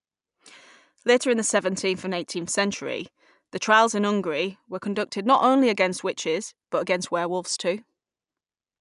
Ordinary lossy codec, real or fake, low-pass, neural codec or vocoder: none; real; 10.8 kHz; none